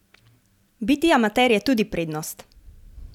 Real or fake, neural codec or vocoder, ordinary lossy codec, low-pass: real; none; none; 19.8 kHz